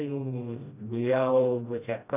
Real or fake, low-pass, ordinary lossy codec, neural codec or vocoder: fake; 3.6 kHz; none; codec, 16 kHz, 1 kbps, FreqCodec, smaller model